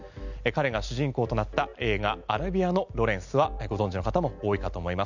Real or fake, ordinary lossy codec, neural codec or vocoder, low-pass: real; none; none; 7.2 kHz